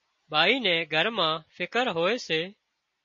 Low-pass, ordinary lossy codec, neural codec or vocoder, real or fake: 7.2 kHz; MP3, 32 kbps; none; real